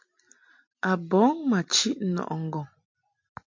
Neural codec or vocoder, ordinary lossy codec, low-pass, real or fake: none; MP3, 48 kbps; 7.2 kHz; real